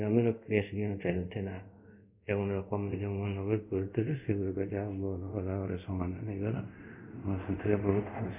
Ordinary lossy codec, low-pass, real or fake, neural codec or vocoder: none; 3.6 kHz; fake; codec, 24 kHz, 0.5 kbps, DualCodec